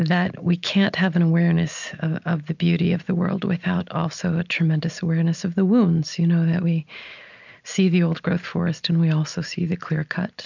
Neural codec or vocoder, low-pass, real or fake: none; 7.2 kHz; real